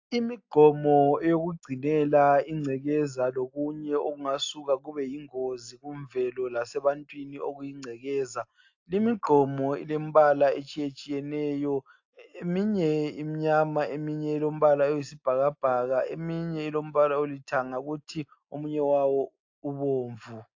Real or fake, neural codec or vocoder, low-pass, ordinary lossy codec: real; none; 7.2 kHz; AAC, 48 kbps